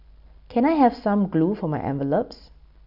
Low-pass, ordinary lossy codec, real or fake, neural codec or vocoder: 5.4 kHz; none; real; none